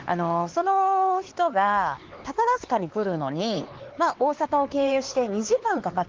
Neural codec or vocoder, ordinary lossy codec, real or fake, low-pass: codec, 16 kHz, 4 kbps, X-Codec, HuBERT features, trained on LibriSpeech; Opus, 16 kbps; fake; 7.2 kHz